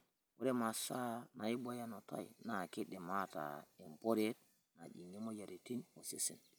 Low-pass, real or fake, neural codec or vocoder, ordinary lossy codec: none; real; none; none